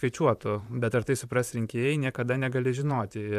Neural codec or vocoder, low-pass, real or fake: vocoder, 44.1 kHz, 128 mel bands, Pupu-Vocoder; 14.4 kHz; fake